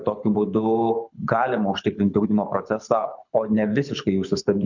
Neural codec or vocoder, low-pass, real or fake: codec, 24 kHz, 6 kbps, HILCodec; 7.2 kHz; fake